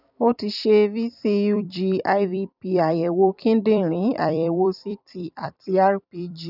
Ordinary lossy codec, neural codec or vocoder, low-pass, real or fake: none; vocoder, 44.1 kHz, 128 mel bands every 256 samples, BigVGAN v2; 5.4 kHz; fake